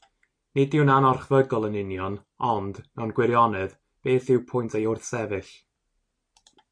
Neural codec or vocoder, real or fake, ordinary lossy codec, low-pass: none; real; MP3, 48 kbps; 9.9 kHz